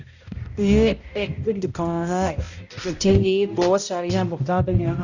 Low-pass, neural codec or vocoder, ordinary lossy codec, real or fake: 7.2 kHz; codec, 16 kHz, 0.5 kbps, X-Codec, HuBERT features, trained on balanced general audio; none; fake